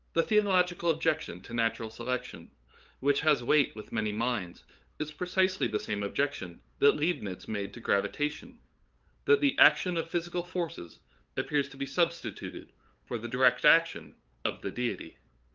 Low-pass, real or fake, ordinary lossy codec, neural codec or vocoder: 7.2 kHz; fake; Opus, 32 kbps; codec, 16 kHz, 8 kbps, FunCodec, trained on LibriTTS, 25 frames a second